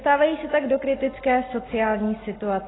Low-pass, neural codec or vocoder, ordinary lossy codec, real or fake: 7.2 kHz; none; AAC, 16 kbps; real